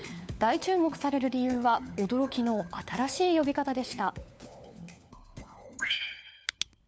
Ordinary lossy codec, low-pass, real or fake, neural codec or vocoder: none; none; fake; codec, 16 kHz, 4 kbps, FunCodec, trained on LibriTTS, 50 frames a second